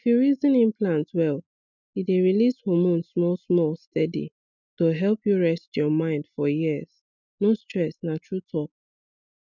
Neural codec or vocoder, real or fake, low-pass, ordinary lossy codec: none; real; 7.2 kHz; none